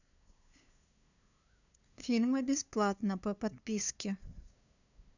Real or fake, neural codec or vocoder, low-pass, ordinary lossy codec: fake; codec, 16 kHz, 2 kbps, FunCodec, trained on LibriTTS, 25 frames a second; 7.2 kHz; none